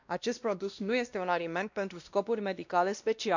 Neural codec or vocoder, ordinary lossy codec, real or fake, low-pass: codec, 16 kHz, 1 kbps, X-Codec, WavLM features, trained on Multilingual LibriSpeech; none; fake; 7.2 kHz